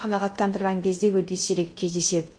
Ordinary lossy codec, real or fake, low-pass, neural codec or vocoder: MP3, 48 kbps; fake; 9.9 kHz; codec, 16 kHz in and 24 kHz out, 0.6 kbps, FocalCodec, streaming, 2048 codes